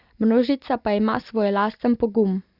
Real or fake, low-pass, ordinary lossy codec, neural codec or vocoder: real; 5.4 kHz; Opus, 64 kbps; none